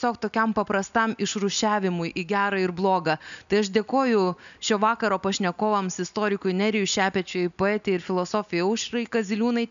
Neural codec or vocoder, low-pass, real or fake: none; 7.2 kHz; real